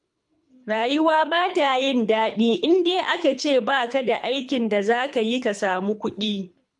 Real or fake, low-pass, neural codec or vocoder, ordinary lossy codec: fake; 10.8 kHz; codec, 24 kHz, 3 kbps, HILCodec; MP3, 64 kbps